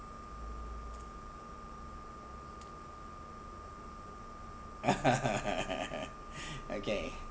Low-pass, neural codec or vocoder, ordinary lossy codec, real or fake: none; none; none; real